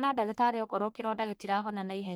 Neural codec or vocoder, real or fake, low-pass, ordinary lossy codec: codec, 44.1 kHz, 1.7 kbps, Pupu-Codec; fake; none; none